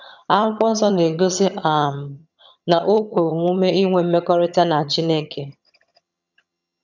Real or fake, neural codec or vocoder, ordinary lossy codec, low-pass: fake; vocoder, 22.05 kHz, 80 mel bands, HiFi-GAN; none; 7.2 kHz